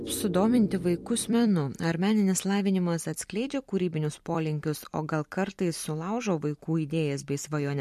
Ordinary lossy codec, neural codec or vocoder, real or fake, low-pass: MP3, 64 kbps; vocoder, 44.1 kHz, 128 mel bands every 256 samples, BigVGAN v2; fake; 14.4 kHz